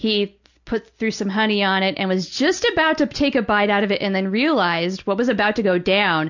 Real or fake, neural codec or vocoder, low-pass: real; none; 7.2 kHz